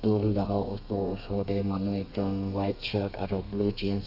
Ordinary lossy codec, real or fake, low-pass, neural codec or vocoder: none; fake; 5.4 kHz; codec, 32 kHz, 1.9 kbps, SNAC